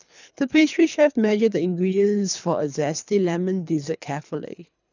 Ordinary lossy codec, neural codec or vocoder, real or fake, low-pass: none; codec, 24 kHz, 3 kbps, HILCodec; fake; 7.2 kHz